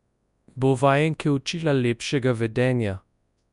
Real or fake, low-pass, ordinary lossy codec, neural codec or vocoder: fake; 10.8 kHz; none; codec, 24 kHz, 0.9 kbps, WavTokenizer, large speech release